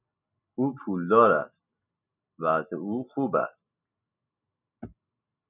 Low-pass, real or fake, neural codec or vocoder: 3.6 kHz; real; none